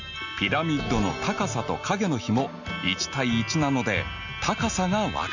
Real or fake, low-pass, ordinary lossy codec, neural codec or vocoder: real; 7.2 kHz; none; none